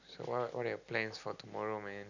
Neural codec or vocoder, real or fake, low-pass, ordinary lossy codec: none; real; 7.2 kHz; none